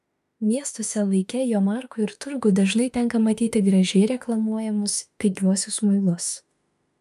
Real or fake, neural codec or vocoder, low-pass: fake; autoencoder, 48 kHz, 32 numbers a frame, DAC-VAE, trained on Japanese speech; 14.4 kHz